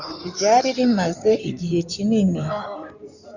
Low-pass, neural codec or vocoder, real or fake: 7.2 kHz; codec, 16 kHz in and 24 kHz out, 2.2 kbps, FireRedTTS-2 codec; fake